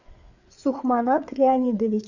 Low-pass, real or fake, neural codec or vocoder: 7.2 kHz; fake; codec, 16 kHz, 4 kbps, FreqCodec, larger model